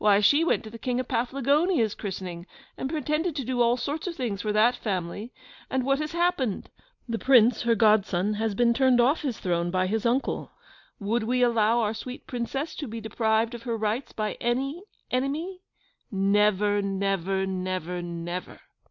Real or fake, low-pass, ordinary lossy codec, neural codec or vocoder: real; 7.2 kHz; MP3, 64 kbps; none